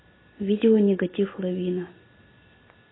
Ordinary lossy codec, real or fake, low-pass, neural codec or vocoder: AAC, 16 kbps; real; 7.2 kHz; none